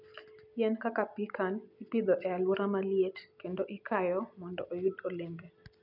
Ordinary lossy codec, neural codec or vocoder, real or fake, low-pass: none; none; real; 5.4 kHz